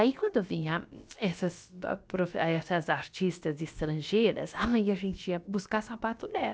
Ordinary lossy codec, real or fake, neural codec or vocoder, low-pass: none; fake; codec, 16 kHz, about 1 kbps, DyCAST, with the encoder's durations; none